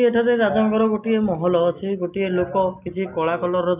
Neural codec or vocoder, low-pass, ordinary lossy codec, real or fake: none; 3.6 kHz; none; real